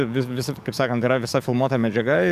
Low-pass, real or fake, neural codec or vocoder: 14.4 kHz; fake; codec, 44.1 kHz, 7.8 kbps, DAC